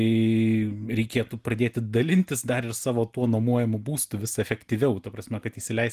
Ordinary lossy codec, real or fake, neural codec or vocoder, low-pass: Opus, 24 kbps; real; none; 14.4 kHz